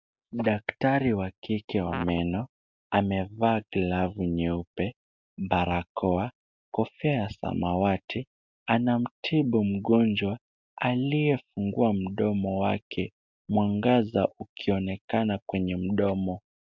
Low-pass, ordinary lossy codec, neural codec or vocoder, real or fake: 7.2 kHz; MP3, 64 kbps; none; real